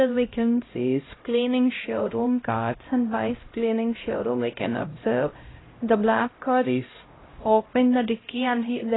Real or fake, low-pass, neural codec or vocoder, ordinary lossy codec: fake; 7.2 kHz; codec, 16 kHz, 0.5 kbps, X-Codec, HuBERT features, trained on LibriSpeech; AAC, 16 kbps